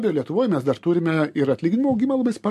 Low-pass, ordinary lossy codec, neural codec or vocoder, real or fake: 14.4 kHz; MP3, 64 kbps; none; real